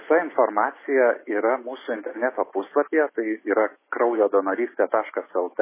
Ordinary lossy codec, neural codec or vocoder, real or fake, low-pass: MP3, 16 kbps; none; real; 3.6 kHz